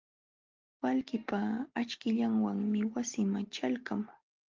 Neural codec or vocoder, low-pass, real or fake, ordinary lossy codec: none; 7.2 kHz; real; Opus, 32 kbps